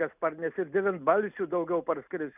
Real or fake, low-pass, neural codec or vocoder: real; 3.6 kHz; none